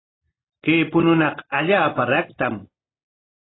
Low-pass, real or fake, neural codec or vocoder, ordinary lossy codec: 7.2 kHz; real; none; AAC, 16 kbps